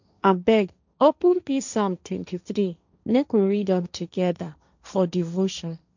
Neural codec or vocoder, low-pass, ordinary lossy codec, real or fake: codec, 16 kHz, 1.1 kbps, Voila-Tokenizer; 7.2 kHz; none; fake